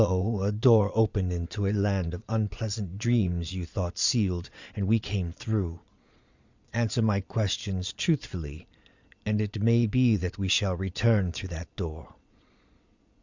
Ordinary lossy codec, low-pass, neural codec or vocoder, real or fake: Opus, 64 kbps; 7.2 kHz; vocoder, 22.05 kHz, 80 mel bands, Vocos; fake